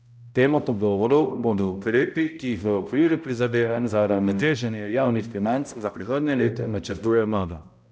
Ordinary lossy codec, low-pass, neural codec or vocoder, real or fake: none; none; codec, 16 kHz, 0.5 kbps, X-Codec, HuBERT features, trained on balanced general audio; fake